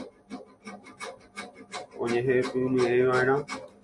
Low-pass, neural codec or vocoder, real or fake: 10.8 kHz; none; real